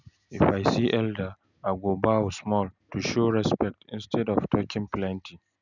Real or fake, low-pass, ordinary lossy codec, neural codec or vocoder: real; 7.2 kHz; none; none